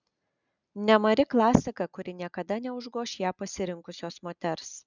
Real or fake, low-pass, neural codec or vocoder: real; 7.2 kHz; none